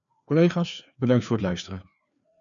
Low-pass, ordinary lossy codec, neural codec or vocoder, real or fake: 7.2 kHz; MP3, 96 kbps; codec, 16 kHz, 2 kbps, FreqCodec, larger model; fake